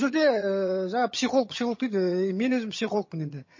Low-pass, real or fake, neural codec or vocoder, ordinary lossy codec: 7.2 kHz; fake; vocoder, 22.05 kHz, 80 mel bands, HiFi-GAN; MP3, 32 kbps